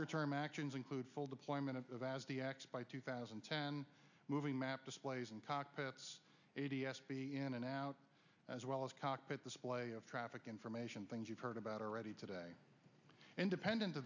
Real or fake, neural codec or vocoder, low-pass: real; none; 7.2 kHz